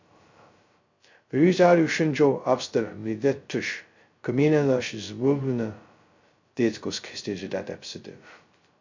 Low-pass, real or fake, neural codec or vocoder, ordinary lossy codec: 7.2 kHz; fake; codec, 16 kHz, 0.2 kbps, FocalCodec; MP3, 64 kbps